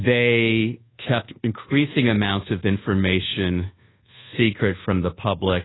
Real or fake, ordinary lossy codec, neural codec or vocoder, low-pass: fake; AAC, 16 kbps; codec, 24 kHz, 1.2 kbps, DualCodec; 7.2 kHz